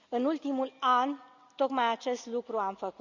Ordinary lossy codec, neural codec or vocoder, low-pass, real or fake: Opus, 64 kbps; none; 7.2 kHz; real